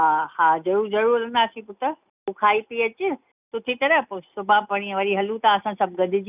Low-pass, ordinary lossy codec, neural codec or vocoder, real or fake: 3.6 kHz; none; none; real